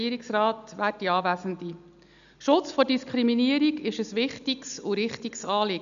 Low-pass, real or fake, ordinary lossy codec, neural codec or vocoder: 7.2 kHz; real; none; none